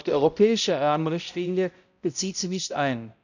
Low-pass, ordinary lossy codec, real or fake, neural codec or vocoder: 7.2 kHz; none; fake; codec, 16 kHz, 0.5 kbps, X-Codec, HuBERT features, trained on balanced general audio